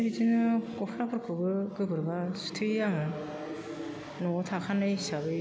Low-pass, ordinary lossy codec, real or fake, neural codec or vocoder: none; none; real; none